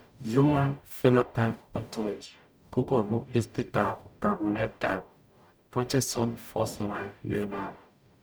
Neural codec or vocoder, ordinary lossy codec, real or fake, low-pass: codec, 44.1 kHz, 0.9 kbps, DAC; none; fake; none